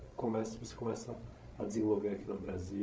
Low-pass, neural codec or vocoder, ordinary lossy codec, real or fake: none; codec, 16 kHz, 16 kbps, FreqCodec, larger model; none; fake